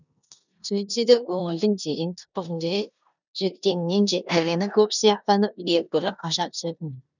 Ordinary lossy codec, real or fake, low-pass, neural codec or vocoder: none; fake; 7.2 kHz; codec, 16 kHz in and 24 kHz out, 0.9 kbps, LongCat-Audio-Codec, four codebook decoder